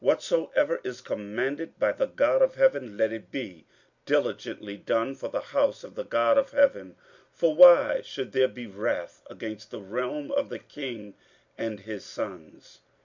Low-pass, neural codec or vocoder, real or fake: 7.2 kHz; none; real